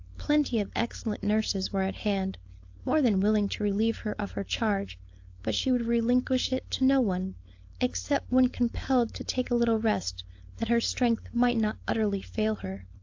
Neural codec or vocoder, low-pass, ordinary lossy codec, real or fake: codec, 16 kHz, 4.8 kbps, FACodec; 7.2 kHz; AAC, 48 kbps; fake